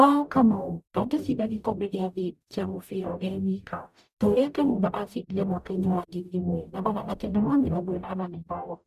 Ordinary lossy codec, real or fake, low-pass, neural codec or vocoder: none; fake; 14.4 kHz; codec, 44.1 kHz, 0.9 kbps, DAC